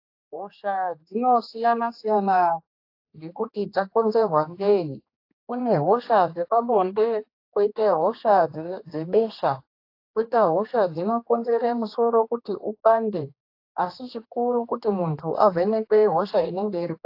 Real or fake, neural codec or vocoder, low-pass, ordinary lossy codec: fake; codec, 16 kHz, 2 kbps, X-Codec, HuBERT features, trained on general audio; 5.4 kHz; AAC, 32 kbps